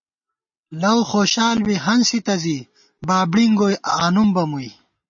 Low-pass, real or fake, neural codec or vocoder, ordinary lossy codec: 7.2 kHz; real; none; MP3, 32 kbps